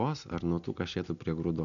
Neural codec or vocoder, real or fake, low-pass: none; real; 7.2 kHz